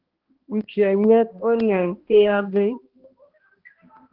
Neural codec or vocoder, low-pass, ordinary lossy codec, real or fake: codec, 16 kHz, 1 kbps, X-Codec, HuBERT features, trained on balanced general audio; 5.4 kHz; Opus, 16 kbps; fake